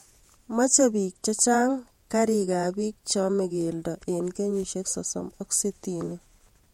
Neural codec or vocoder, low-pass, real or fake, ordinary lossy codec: vocoder, 48 kHz, 128 mel bands, Vocos; 19.8 kHz; fake; MP3, 64 kbps